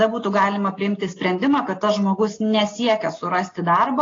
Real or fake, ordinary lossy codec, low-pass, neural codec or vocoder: real; AAC, 32 kbps; 7.2 kHz; none